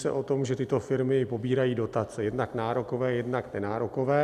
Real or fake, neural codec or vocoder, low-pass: real; none; 14.4 kHz